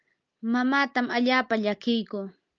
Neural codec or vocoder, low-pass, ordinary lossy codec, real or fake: none; 7.2 kHz; Opus, 24 kbps; real